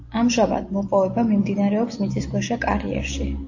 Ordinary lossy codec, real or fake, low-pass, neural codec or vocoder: AAC, 48 kbps; real; 7.2 kHz; none